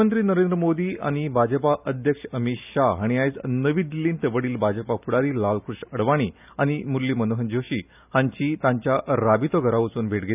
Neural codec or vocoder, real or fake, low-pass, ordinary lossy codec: none; real; 3.6 kHz; none